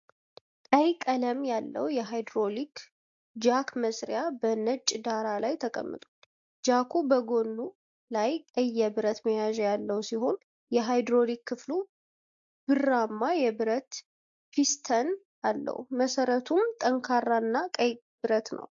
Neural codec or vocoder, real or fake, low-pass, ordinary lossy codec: none; real; 7.2 kHz; AAC, 64 kbps